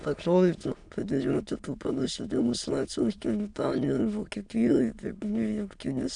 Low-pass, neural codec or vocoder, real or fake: 9.9 kHz; autoencoder, 22.05 kHz, a latent of 192 numbers a frame, VITS, trained on many speakers; fake